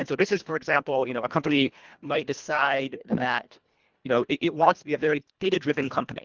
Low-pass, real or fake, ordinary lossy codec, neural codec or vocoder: 7.2 kHz; fake; Opus, 24 kbps; codec, 24 kHz, 1.5 kbps, HILCodec